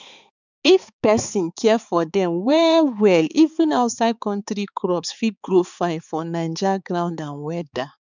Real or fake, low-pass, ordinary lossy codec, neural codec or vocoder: fake; 7.2 kHz; none; codec, 16 kHz, 4 kbps, X-Codec, HuBERT features, trained on balanced general audio